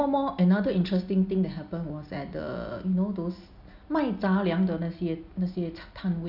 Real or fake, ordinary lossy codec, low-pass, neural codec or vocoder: real; none; 5.4 kHz; none